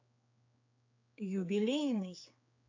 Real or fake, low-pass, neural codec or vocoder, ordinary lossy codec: fake; 7.2 kHz; codec, 16 kHz, 4 kbps, X-Codec, HuBERT features, trained on general audio; AAC, 48 kbps